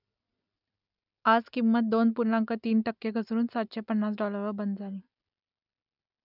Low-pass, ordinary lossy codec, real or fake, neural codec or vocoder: 5.4 kHz; none; real; none